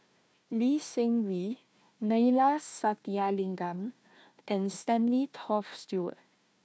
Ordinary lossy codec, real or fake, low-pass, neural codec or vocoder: none; fake; none; codec, 16 kHz, 1 kbps, FunCodec, trained on Chinese and English, 50 frames a second